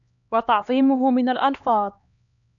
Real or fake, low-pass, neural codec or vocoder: fake; 7.2 kHz; codec, 16 kHz, 2 kbps, X-Codec, HuBERT features, trained on LibriSpeech